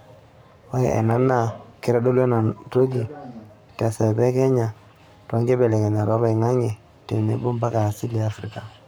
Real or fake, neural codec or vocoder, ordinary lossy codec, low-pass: fake; codec, 44.1 kHz, 7.8 kbps, Pupu-Codec; none; none